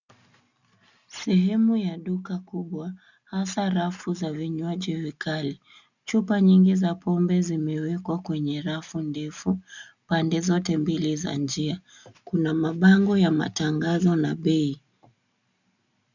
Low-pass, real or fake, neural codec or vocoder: 7.2 kHz; real; none